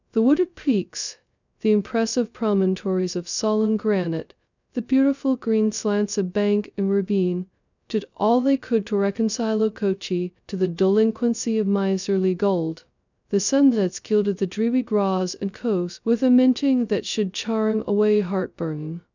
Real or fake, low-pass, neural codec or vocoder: fake; 7.2 kHz; codec, 16 kHz, 0.2 kbps, FocalCodec